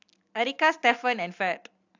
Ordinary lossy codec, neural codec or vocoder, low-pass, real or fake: AAC, 48 kbps; none; 7.2 kHz; real